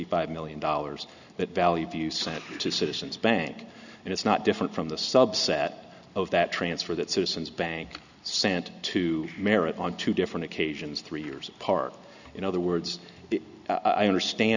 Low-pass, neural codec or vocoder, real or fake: 7.2 kHz; none; real